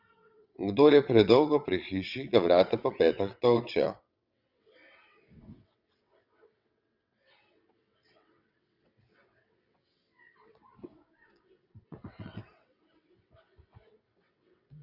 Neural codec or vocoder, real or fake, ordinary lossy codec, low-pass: vocoder, 22.05 kHz, 80 mel bands, WaveNeXt; fake; Opus, 64 kbps; 5.4 kHz